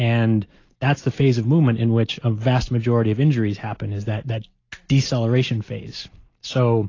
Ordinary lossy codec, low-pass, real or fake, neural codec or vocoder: AAC, 32 kbps; 7.2 kHz; real; none